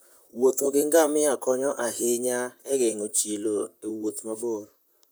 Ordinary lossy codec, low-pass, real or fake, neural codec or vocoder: none; none; fake; vocoder, 44.1 kHz, 128 mel bands, Pupu-Vocoder